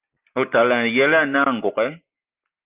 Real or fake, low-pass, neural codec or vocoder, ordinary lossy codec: real; 3.6 kHz; none; Opus, 32 kbps